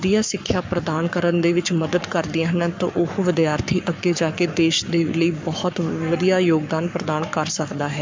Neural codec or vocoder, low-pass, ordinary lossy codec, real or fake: codec, 44.1 kHz, 7.8 kbps, Pupu-Codec; 7.2 kHz; MP3, 64 kbps; fake